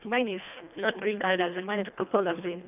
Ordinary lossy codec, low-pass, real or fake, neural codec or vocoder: none; 3.6 kHz; fake; codec, 24 kHz, 1.5 kbps, HILCodec